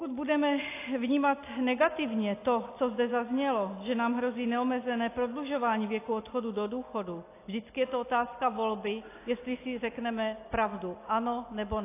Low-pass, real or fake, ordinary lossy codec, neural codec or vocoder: 3.6 kHz; real; AAC, 24 kbps; none